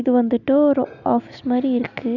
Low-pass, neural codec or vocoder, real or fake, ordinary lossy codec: 7.2 kHz; none; real; none